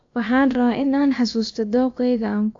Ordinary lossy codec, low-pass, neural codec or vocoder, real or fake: AAC, 48 kbps; 7.2 kHz; codec, 16 kHz, about 1 kbps, DyCAST, with the encoder's durations; fake